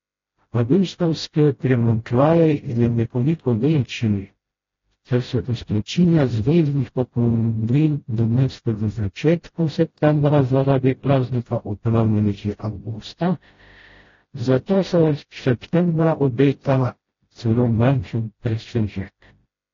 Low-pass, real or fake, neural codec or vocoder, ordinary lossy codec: 7.2 kHz; fake; codec, 16 kHz, 0.5 kbps, FreqCodec, smaller model; AAC, 32 kbps